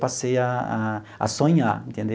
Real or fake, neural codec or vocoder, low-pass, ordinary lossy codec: real; none; none; none